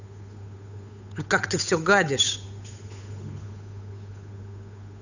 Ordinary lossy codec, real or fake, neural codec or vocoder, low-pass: none; fake; codec, 16 kHz, 8 kbps, FunCodec, trained on Chinese and English, 25 frames a second; 7.2 kHz